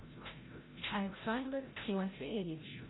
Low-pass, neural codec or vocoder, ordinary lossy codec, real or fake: 7.2 kHz; codec, 16 kHz, 0.5 kbps, FreqCodec, larger model; AAC, 16 kbps; fake